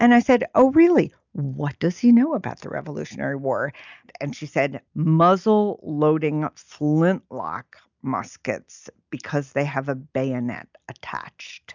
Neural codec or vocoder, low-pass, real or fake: none; 7.2 kHz; real